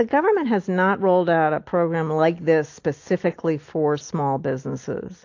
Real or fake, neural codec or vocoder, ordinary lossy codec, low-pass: real; none; AAC, 48 kbps; 7.2 kHz